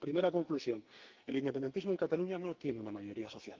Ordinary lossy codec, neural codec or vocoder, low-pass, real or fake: Opus, 32 kbps; codec, 44.1 kHz, 2.6 kbps, SNAC; 7.2 kHz; fake